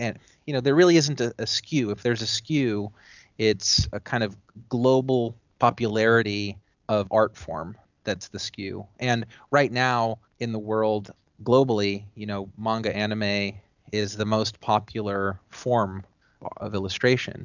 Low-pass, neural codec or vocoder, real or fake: 7.2 kHz; none; real